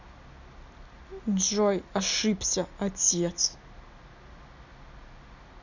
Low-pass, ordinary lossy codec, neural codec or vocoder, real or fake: 7.2 kHz; none; none; real